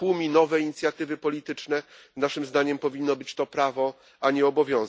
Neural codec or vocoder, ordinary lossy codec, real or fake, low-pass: none; none; real; none